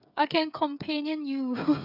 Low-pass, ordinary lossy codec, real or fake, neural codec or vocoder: 5.4 kHz; none; fake; codec, 16 kHz, 8 kbps, FreqCodec, smaller model